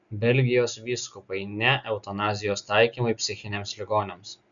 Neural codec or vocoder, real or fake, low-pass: none; real; 7.2 kHz